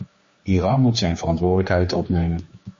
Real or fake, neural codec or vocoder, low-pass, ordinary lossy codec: fake; codec, 16 kHz, 2 kbps, X-Codec, HuBERT features, trained on general audio; 7.2 kHz; MP3, 32 kbps